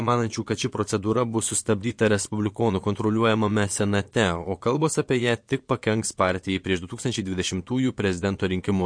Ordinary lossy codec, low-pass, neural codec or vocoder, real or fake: MP3, 48 kbps; 9.9 kHz; vocoder, 22.05 kHz, 80 mel bands, Vocos; fake